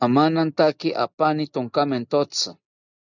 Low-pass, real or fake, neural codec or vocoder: 7.2 kHz; real; none